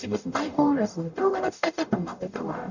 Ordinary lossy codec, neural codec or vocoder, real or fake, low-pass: none; codec, 44.1 kHz, 0.9 kbps, DAC; fake; 7.2 kHz